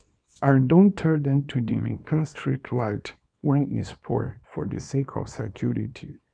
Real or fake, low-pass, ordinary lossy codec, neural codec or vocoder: fake; 9.9 kHz; none; codec, 24 kHz, 0.9 kbps, WavTokenizer, small release